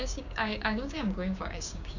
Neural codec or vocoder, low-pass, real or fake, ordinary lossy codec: codec, 16 kHz, 6 kbps, DAC; 7.2 kHz; fake; none